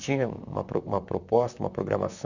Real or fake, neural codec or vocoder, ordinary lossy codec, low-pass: fake; vocoder, 44.1 kHz, 128 mel bands, Pupu-Vocoder; none; 7.2 kHz